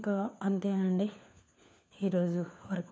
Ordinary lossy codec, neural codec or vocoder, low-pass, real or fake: none; codec, 16 kHz, 4 kbps, FunCodec, trained on LibriTTS, 50 frames a second; none; fake